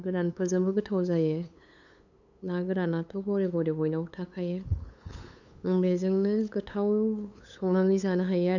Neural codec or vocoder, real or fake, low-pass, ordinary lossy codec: codec, 16 kHz, 8 kbps, FunCodec, trained on LibriTTS, 25 frames a second; fake; 7.2 kHz; none